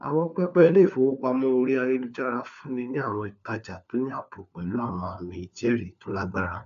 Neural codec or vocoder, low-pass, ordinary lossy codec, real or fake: codec, 16 kHz, 4 kbps, FunCodec, trained on LibriTTS, 50 frames a second; 7.2 kHz; AAC, 96 kbps; fake